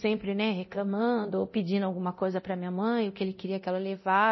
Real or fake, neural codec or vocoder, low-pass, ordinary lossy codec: fake; codec, 24 kHz, 0.9 kbps, DualCodec; 7.2 kHz; MP3, 24 kbps